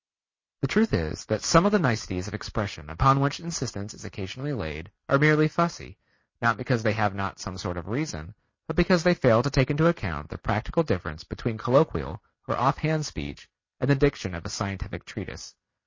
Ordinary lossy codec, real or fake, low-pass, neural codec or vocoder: MP3, 32 kbps; real; 7.2 kHz; none